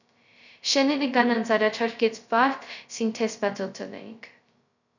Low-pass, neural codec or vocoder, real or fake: 7.2 kHz; codec, 16 kHz, 0.2 kbps, FocalCodec; fake